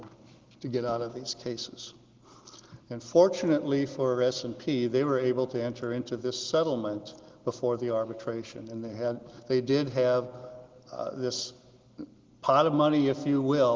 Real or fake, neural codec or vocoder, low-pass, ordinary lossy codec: real; none; 7.2 kHz; Opus, 16 kbps